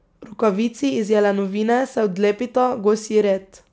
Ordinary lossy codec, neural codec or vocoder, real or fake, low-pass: none; none; real; none